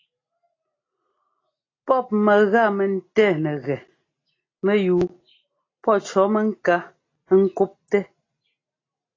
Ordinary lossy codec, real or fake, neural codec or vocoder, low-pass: AAC, 32 kbps; real; none; 7.2 kHz